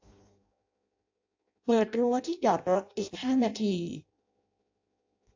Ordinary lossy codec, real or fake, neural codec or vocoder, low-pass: AAC, 48 kbps; fake; codec, 16 kHz in and 24 kHz out, 0.6 kbps, FireRedTTS-2 codec; 7.2 kHz